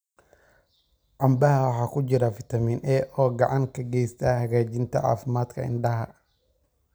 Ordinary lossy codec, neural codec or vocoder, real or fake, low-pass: none; none; real; none